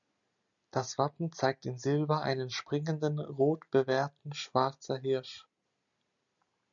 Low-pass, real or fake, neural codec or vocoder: 7.2 kHz; real; none